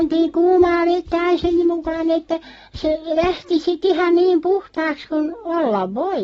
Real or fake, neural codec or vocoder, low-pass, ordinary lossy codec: real; none; 9.9 kHz; AAC, 24 kbps